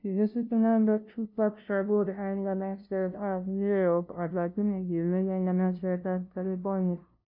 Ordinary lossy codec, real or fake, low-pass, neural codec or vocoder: none; fake; 5.4 kHz; codec, 16 kHz, 0.5 kbps, FunCodec, trained on LibriTTS, 25 frames a second